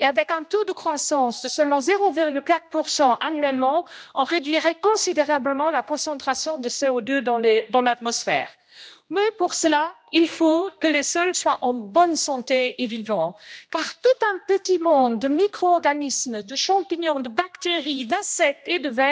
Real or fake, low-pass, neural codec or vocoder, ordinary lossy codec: fake; none; codec, 16 kHz, 1 kbps, X-Codec, HuBERT features, trained on general audio; none